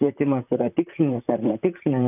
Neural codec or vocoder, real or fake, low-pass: vocoder, 44.1 kHz, 128 mel bands, Pupu-Vocoder; fake; 3.6 kHz